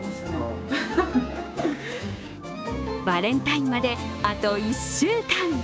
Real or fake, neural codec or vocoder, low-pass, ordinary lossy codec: fake; codec, 16 kHz, 6 kbps, DAC; none; none